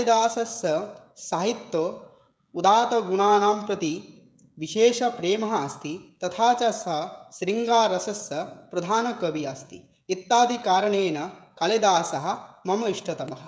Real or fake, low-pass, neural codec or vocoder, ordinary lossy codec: fake; none; codec, 16 kHz, 16 kbps, FreqCodec, smaller model; none